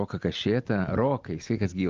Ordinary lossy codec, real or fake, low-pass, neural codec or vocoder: Opus, 24 kbps; real; 7.2 kHz; none